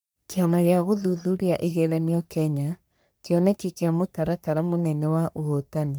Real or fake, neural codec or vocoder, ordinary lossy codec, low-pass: fake; codec, 44.1 kHz, 3.4 kbps, Pupu-Codec; none; none